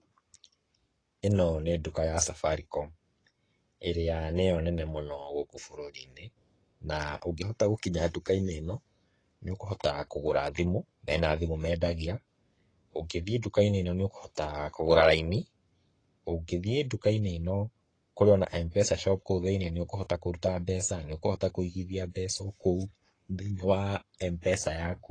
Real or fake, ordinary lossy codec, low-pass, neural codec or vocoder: fake; AAC, 32 kbps; 9.9 kHz; codec, 44.1 kHz, 7.8 kbps, Pupu-Codec